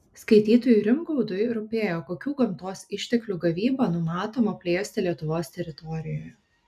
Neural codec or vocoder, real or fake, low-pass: none; real; 14.4 kHz